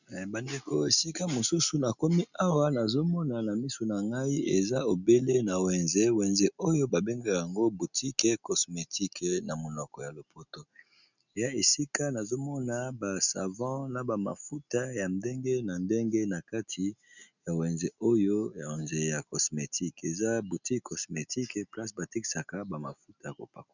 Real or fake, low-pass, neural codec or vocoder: real; 7.2 kHz; none